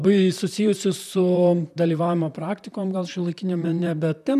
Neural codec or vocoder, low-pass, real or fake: vocoder, 44.1 kHz, 128 mel bands, Pupu-Vocoder; 14.4 kHz; fake